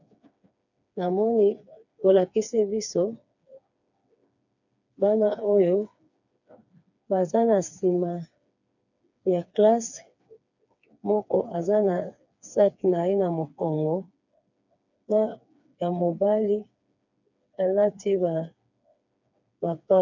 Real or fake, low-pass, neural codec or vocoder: fake; 7.2 kHz; codec, 16 kHz, 4 kbps, FreqCodec, smaller model